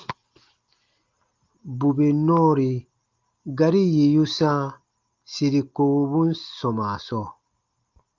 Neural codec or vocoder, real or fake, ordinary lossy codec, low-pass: none; real; Opus, 24 kbps; 7.2 kHz